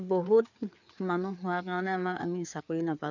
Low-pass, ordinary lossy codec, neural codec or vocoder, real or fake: 7.2 kHz; none; codec, 16 kHz, 4 kbps, FreqCodec, larger model; fake